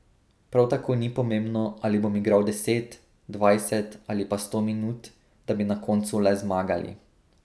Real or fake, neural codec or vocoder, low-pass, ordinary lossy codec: real; none; none; none